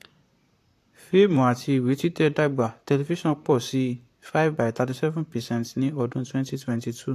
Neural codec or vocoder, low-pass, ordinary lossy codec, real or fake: vocoder, 44.1 kHz, 128 mel bands, Pupu-Vocoder; 14.4 kHz; AAC, 64 kbps; fake